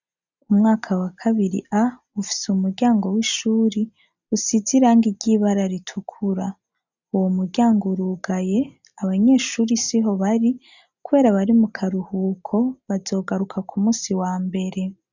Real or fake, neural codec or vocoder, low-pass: real; none; 7.2 kHz